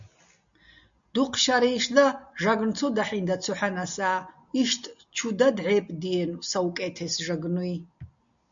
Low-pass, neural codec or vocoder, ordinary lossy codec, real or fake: 7.2 kHz; none; MP3, 64 kbps; real